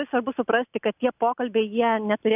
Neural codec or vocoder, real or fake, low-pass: none; real; 3.6 kHz